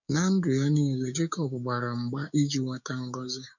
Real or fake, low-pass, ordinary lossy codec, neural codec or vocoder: fake; 7.2 kHz; none; codec, 16 kHz, 6 kbps, DAC